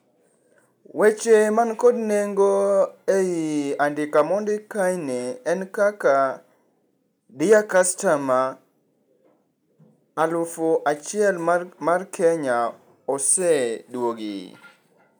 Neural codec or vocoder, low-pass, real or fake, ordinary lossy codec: none; none; real; none